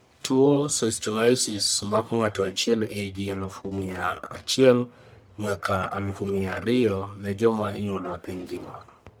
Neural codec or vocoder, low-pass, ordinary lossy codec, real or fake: codec, 44.1 kHz, 1.7 kbps, Pupu-Codec; none; none; fake